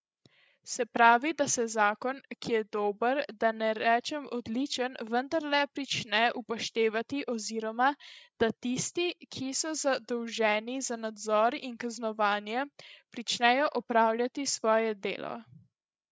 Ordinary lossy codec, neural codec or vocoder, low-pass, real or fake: none; codec, 16 kHz, 16 kbps, FreqCodec, larger model; none; fake